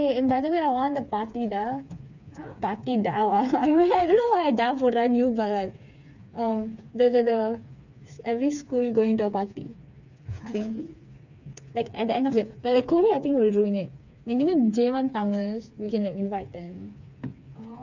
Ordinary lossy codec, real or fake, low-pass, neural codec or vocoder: none; fake; 7.2 kHz; codec, 16 kHz, 4 kbps, FreqCodec, smaller model